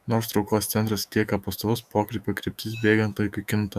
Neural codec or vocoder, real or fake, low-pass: autoencoder, 48 kHz, 128 numbers a frame, DAC-VAE, trained on Japanese speech; fake; 14.4 kHz